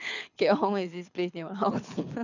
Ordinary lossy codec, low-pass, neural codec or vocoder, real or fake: none; 7.2 kHz; codec, 24 kHz, 6 kbps, HILCodec; fake